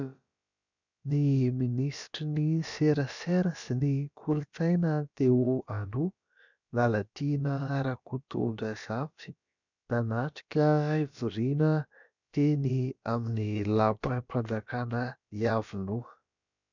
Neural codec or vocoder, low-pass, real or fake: codec, 16 kHz, about 1 kbps, DyCAST, with the encoder's durations; 7.2 kHz; fake